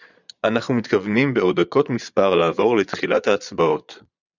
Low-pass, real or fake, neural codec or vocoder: 7.2 kHz; fake; vocoder, 22.05 kHz, 80 mel bands, Vocos